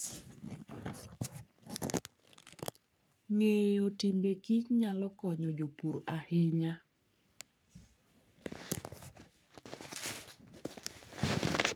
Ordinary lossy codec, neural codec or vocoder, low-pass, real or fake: none; codec, 44.1 kHz, 3.4 kbps, Pupu-Codec; none; fake